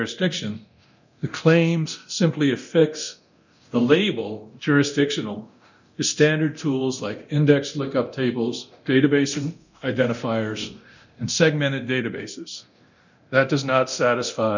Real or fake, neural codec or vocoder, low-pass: fake; codec, 24 kHz, 0.9 kbps, DualCodec; 7.2 kHz